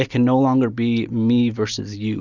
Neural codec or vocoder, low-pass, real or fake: none; 7.2 kHz; real